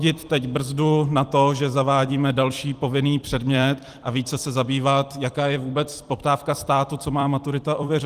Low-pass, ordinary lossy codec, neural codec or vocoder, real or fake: 14.4 kHz; Opus, 24 kbps; vocoder, 44.1 kHz, 128 mel bands every 256 samples, BigVGAN v2; fake